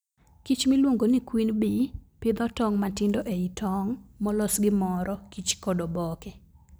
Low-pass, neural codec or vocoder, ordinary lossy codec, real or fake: none; none; none; real